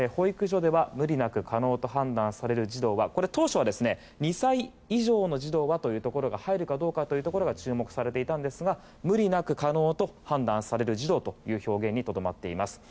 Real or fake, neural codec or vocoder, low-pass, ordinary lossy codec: real; none; none; none